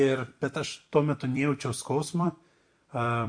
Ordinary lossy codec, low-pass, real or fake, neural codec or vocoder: MP3, 48 kbps; 9.9 kHz; fake; vocoder, 44.1 kHz, 128 mel bands every 256 samples, BigVGAN v2